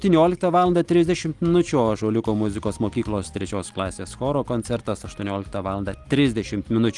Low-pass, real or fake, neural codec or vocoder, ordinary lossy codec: 10.8 kHz; real; none; Opus, 24 kbps